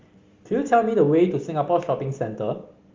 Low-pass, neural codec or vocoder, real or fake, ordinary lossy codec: 7.2 kHz; none; real; Opus, 32 kbps